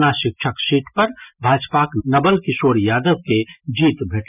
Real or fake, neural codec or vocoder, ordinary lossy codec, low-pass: real; none; none; 3.6 kHz